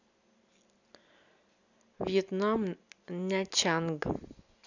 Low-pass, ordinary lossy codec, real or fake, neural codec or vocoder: 7.2 kHz; none; real; none